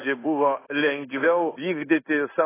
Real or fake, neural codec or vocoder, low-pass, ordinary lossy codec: fake; codec, 16 kHz in and 24 kHz out, 1 kbps, XY-Tokenizer; 3.6 kHz; AAC, 16 kbps